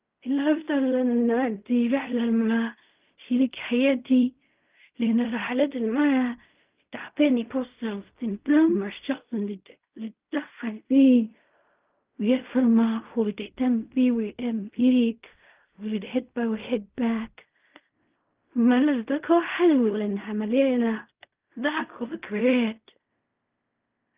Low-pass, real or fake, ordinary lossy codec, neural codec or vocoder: 3.6 kHz; fake; Opus, 24 kbps; codec, 16 kHz in and 24 kHz out, 0.4 kbps, LongCat-Audio-Codec, fine tuned four codebook decoder